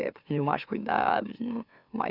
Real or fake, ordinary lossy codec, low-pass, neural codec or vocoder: fake; Opus, 64 kbps; 5.4 kHz; autoencoder, 44.1 kHz, a latent of 192 numbers a frame, MeloTTS